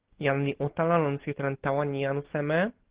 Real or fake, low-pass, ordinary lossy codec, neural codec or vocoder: real; 3.6 kHz; Opus, 16 kbps; none